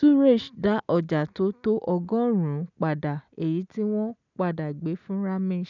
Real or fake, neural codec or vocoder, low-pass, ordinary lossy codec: real; none; 7.2 kHz; none